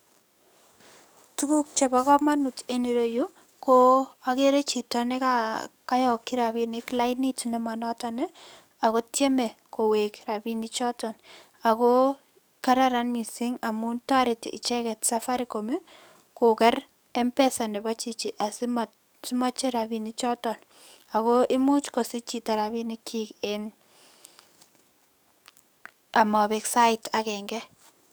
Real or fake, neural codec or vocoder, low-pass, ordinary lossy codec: fake; codec, 44.1 kHz, 7.8 kbps, DAC; none; none